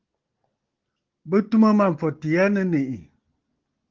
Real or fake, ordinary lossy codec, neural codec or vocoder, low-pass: real; Opus, 16 kbps; none; 7.2 kHz